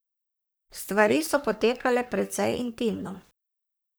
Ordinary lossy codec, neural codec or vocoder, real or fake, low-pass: none; codec, 44.1 kHz, 3.4 kbps, Pupu-Codec; fake; none